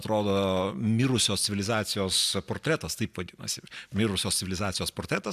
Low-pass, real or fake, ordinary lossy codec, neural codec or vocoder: 14.4 kHz; real; AAC, 96 kbps; none